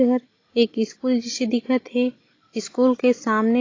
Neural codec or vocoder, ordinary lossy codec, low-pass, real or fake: none; AAC, 32 kbps; 7.2 kHz; real